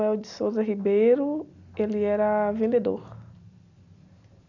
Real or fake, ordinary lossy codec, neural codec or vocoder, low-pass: real; none; none; 7.2 kHz